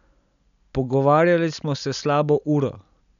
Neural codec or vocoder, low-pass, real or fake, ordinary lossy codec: none; 7.2 kHz; real; none